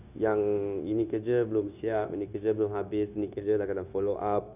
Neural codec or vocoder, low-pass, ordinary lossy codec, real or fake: codec, 16 kHz, 0.9 kbps, LongCat-Audio-Codec; 3.6 kHz; none; fake